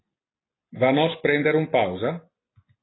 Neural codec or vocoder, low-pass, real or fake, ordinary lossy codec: none; 7.2 kHz; real; AAC, 16 kbps